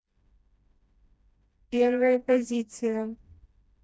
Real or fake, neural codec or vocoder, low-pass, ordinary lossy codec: fake; codec, 16 kHz, 1 kbps, FreqCodec, smaller model; none; none